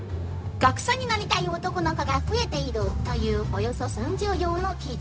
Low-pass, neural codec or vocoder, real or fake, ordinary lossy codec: none; codec, 16 kHz, 0.4 kbps, LongCat-Audio-Codec; fake; none